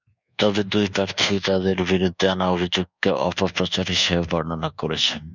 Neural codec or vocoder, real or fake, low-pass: codec, 24 kHz, 1.2 kbps, DualCodec; fake; 7.2 kHz